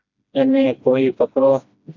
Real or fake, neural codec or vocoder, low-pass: fake; codec, 16 kHz, 1 kbps, FreqCodec, smaller model; 7.2 kHz